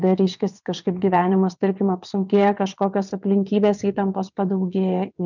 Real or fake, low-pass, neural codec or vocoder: fake; 7.2 kHz; vocoder, 22.05 kHz, 80 mel bands, WaveNeXt